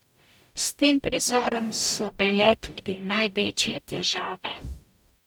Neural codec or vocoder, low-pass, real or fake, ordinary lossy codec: codec, 44.1 kHz, 0.9 kbps, DAC; none; fake; none